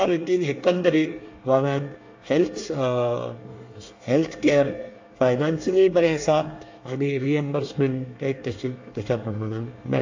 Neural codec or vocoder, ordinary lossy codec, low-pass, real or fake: codec, 24 kHz, 1 kbps, SNAC; AAC, 48 kbps; 7.2 kHz; fake